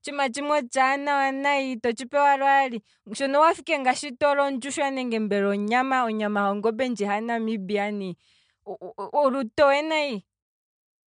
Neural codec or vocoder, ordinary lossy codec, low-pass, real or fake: none; MP3, 64 kbps; 9.9 kHz; real